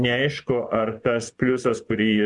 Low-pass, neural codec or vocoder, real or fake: 10.8 kHz; vocoder, 24 kHz, 100 mel bands, Vocos; fake